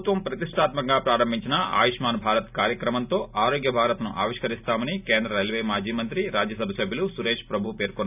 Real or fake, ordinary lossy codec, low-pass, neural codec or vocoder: real; none; 3.6 kHz; none